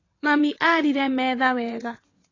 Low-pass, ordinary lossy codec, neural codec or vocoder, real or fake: 7.2 kHz; AAC, 32 kbps; vocoder, 22.05 kHz, 80 mel bands, WaveNeXt; fake